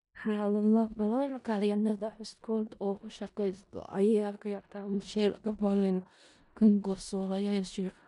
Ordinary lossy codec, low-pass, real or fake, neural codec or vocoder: none; 10.8 kHz; fake; codec, 16 kHz in and 24 kHz out, 0.4 kbps, LongCat-Audio-Codec, four codebook decoder